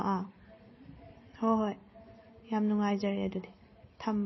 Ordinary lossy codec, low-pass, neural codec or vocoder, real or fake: MP3, 24 kbps; 7.2 kHz; none; real